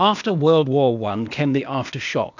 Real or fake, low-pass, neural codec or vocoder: fake; 7.2 kHz; codec, 16 kHz, 0.8 kbps, ZipCodec